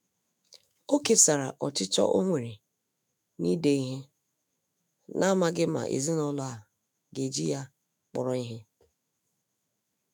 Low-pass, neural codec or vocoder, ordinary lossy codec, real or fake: none; autoencoder, 48 kHz, 128 numbers a frame, DAC-VAE, trained on Japanese speech; none; fake